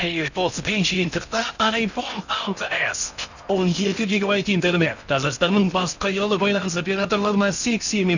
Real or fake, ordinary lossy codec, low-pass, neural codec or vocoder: fake; none; 7.2 kHz; codec, 16 kHz in and 24 kHz out, 0.6 kbps, FocalCodec, streaming, 4096 codes